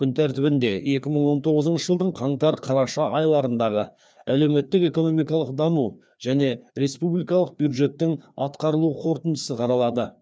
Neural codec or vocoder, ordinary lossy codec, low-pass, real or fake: codec, 16 kHz, 2 kbps, FreqCodec, larger model; none; none; fake